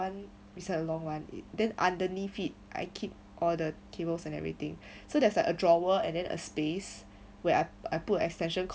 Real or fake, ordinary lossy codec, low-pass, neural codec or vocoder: real; none; none; none